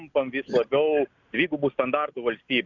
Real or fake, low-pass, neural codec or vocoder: real; 7.2 kHz; none